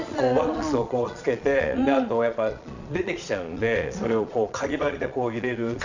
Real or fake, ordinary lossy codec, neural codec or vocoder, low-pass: fake; Opus, 64 kbps; vocoder, 22.05 kHz, 80 mel bands, Vocos; 7.2 kHz